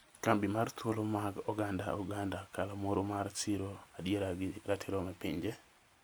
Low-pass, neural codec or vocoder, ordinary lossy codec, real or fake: none; none; none; real